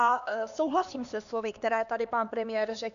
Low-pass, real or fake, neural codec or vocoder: 7.2 kHz; fake; codec, 16 kHz, 2 kbps, X-Codec, HuBERT features, trained on LibriSpeech